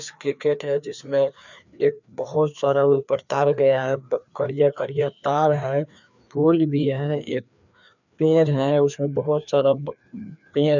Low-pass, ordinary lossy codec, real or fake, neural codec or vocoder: 7.2 kHz; none; fake; codec, 16 kHz, 2 kbps, FreqCodec, larger model